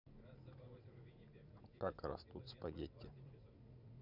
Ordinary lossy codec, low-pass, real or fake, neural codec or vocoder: none; 5.4 kHz; fake; vocoder, 44.1 kHz, 80 mel bands, Vocos